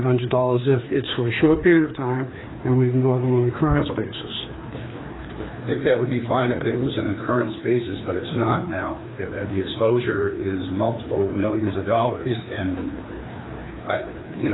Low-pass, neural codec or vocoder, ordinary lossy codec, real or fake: 7.2 kHz; codec, 16 kHz, 2 kbps, FreqCodec, larger model; AAC, 16 kbps; fake